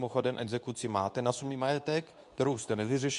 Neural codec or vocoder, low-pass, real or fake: codec, 24 kHz, 0.9 kbps, WavTokenizer, medium speech release version 2; 10.8 kHz; fake